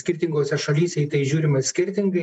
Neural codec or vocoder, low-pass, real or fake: none; 10.8 kHz; real